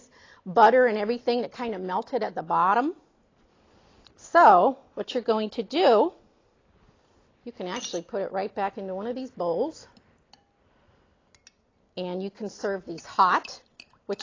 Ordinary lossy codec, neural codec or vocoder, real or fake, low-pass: AAC, 32 kbps; none; real; 7.2 kHz